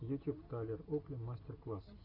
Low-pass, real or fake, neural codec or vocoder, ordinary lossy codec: 5.4 kHz; real; none; AAC, 48 kbps